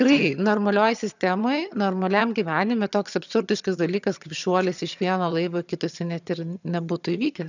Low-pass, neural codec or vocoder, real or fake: 7.2 kHz; vocoder, 22.05 kHz, 80 mel bands, HiFi-GAN; fake